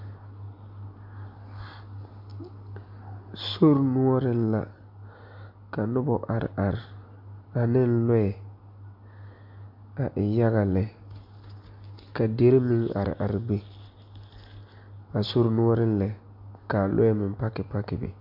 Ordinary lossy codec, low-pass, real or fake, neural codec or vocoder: MP3, 48 kbps; 5.4 kHz; real; none